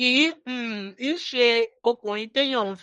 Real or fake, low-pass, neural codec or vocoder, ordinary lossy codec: fake; 10.8 kHz; codec, 24 kHz, 1 kbps, SNAC; MP3, 48 kbps